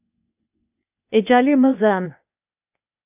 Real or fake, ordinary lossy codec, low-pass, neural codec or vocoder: fake; AAC, 32 kbps; 3.6 kHz; codec, 16 kHz, 0.8 kbps, ZipCodec